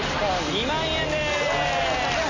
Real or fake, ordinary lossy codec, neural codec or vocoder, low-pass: real; Opus, 64 kbps; none; 7.2 kHz